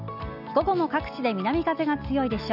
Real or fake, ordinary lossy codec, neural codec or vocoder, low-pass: real; none; none; 5.4 kHz